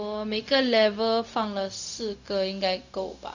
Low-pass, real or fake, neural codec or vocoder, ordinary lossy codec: 7.2 kHz; fake; codec, 16 kHz, 0.4 kbps, LongCat-Audio-Codec; none